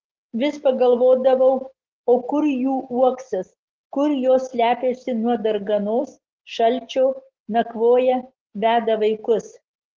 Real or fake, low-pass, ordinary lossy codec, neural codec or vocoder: real; 7.2 kHz; Opus, 16 kbps; none